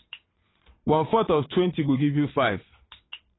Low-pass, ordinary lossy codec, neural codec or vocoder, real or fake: 7.2 kHz; AAC, 16 kbps; vocoder, 22.05 kHz, 80 mel bands, WaveNeXt; fake